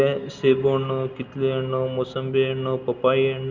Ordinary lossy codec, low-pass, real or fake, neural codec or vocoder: Opus, 32 kbps; 7.2 kHz; real; none